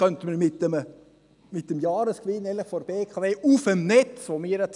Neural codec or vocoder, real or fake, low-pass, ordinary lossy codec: none; real; 10.8 kHz; none